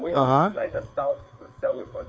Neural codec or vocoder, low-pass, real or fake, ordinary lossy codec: codec, 16 kHz, 4 kbps, FreqCodec, larger model; none; fake; none